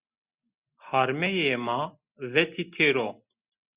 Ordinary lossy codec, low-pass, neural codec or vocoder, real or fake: Opus, 64 kbps; 3.6 kHz; none; real